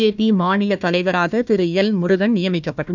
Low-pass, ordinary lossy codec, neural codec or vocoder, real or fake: 7.2 kHz; none; codec, 16 kHz, 1 kbps, FunCodec, trained on Chinese and English, 50 frames a second; fake